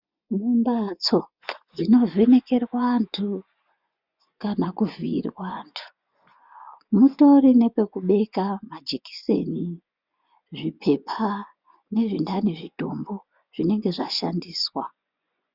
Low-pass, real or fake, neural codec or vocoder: 5.4 kHz; fake; vocoder, 24 kHz, 100 mel bands, Vocos